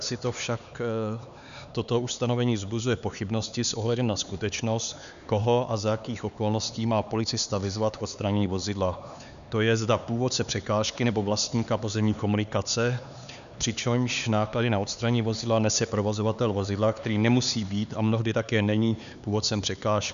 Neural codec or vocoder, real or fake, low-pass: codec, 16 kHz, 4 kbps, X-Codec, HuBERT features, trained on LibriSpeech; fake; 7.2 kHz